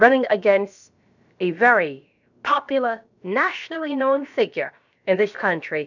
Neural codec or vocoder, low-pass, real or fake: codec, 16 kHz, about 1 kbps, DyCAST, with the encoder's durations; 7.2 kHz; fake